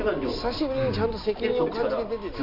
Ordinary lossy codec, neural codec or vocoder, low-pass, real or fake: none; none; 5.4 kHz; real